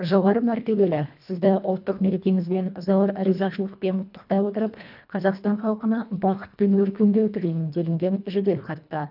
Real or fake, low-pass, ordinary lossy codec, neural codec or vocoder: fake; 5.4 kHz; none; codec, 24 kHz, 1.5 kbps, HILCodec